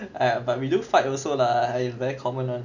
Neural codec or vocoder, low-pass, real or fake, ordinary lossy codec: none; 7.2 kHz; real; none